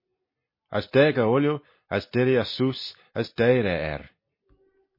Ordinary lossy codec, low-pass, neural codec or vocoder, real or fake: MP3, 24 kbps; 5.4 kHz; none; real